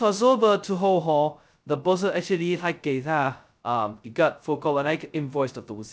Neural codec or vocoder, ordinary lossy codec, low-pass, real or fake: codec, 16 kHz, 0.2 kbps, FocalCodec; none; none; fake